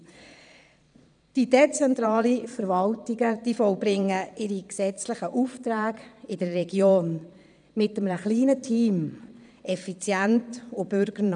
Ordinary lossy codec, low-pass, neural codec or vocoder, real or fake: none; 9.9 kHz; vocoder, 22.05 kHz, 80 mel bands, Vocos; fake